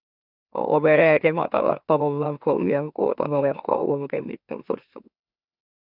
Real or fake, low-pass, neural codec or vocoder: fake; 5.4 kHz; autoencoder, 44.1 kHz, a latent of 192 numbers a frame, MeloTTS